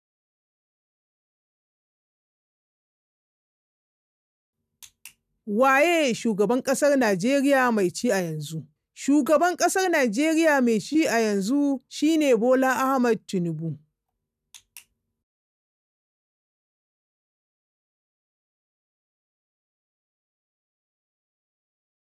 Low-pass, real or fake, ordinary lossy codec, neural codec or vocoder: 14.4 kHz; real; none; none